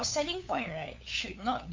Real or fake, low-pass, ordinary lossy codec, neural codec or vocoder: fake; 7.2 kHz; MP3, 64 kbps; codec, 16 kHz, 8 kbps, FunCodec, trained on LibriTTS, 25 frames a second